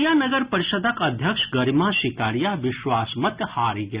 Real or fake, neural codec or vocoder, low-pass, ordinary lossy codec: real; none; 3.6 kHz; Opus, 64 kbps